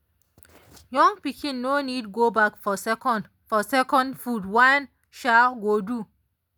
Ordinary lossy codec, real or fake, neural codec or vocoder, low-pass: none; real; none; none